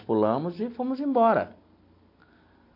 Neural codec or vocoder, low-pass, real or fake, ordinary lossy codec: none; 5.4 kHz; real; MP3, 32 kbps